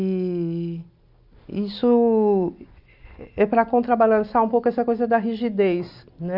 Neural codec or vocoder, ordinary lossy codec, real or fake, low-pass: none; none; real; 5.4 kHz